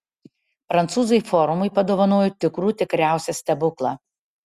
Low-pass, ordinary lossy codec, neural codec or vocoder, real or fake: 14.4 kHz; Opus, 64 kbps; none; real